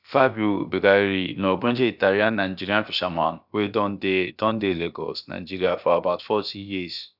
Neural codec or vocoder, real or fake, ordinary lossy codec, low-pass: codec, 16 kHz, about 1 kbps, DyCAST, with the encoder's durations; fake; none; 5.4 kHz